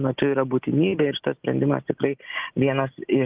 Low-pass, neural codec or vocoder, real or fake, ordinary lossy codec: 3.6 kHz; none; real; Opus, 24 kbps